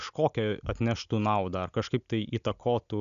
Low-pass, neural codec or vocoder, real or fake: 7.2 kHz; none; real